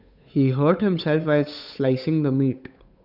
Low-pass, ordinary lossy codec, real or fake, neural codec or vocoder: 5.4 kHz; none; fake; codec, 16 kHz, 8 kbps, FunCodec, trained on Chinese and English, 25 frames a second